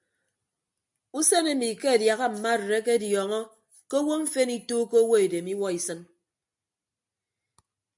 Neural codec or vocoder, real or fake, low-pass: none; real; 10.8 kHz